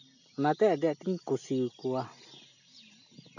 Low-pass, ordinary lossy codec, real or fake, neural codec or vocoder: 7.2 kHz; none; real; none